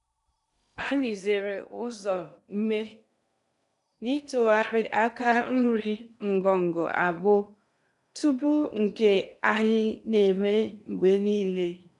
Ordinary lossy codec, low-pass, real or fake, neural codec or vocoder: none; 10.8 kHz; fake; codec, 16 kHz in and 24 kHz out, 0.8 kbps, FocalCodec, streaming, 65536 codes